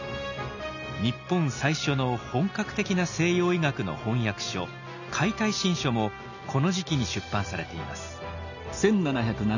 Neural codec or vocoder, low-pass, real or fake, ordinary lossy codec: none; 7.2 kHz; real; none